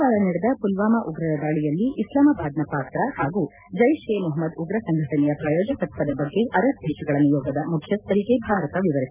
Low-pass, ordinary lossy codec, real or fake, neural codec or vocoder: 3.6 kHz; none; real; none